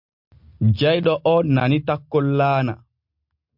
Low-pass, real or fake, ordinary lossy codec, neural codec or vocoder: 5.4 kHz; real; MP3, 48 kbps; none